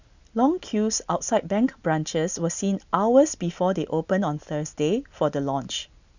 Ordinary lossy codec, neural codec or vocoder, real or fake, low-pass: none; none; real; 7.2 kHz